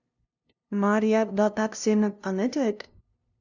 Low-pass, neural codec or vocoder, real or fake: 7.2 kHz; codec, 16 kHz, 0.5 kbps, FunCodec, trained on LibriTTS, 25 frames a second; fake